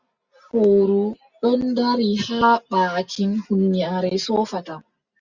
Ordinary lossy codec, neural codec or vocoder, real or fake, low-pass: Opus, 64 kbps; none; real; 7.2 kHz